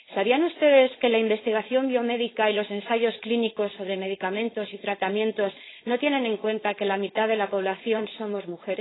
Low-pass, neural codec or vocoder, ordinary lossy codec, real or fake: 7.2 kHz; codec, 16 kHz, 4.8 kbps, FACodec; AAC, 16 kbps; fake